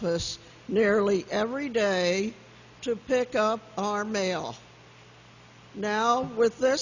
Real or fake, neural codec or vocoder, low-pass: real; none; 7.2 kHz